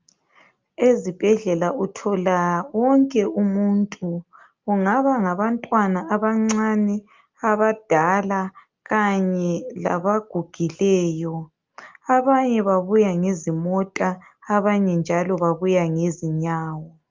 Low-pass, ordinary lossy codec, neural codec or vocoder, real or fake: 7.2 kHz; Opus, 24 kbps; none; real